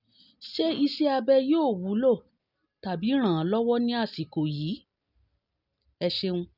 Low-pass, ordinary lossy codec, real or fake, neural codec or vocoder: 5.4 kHz; none; real; none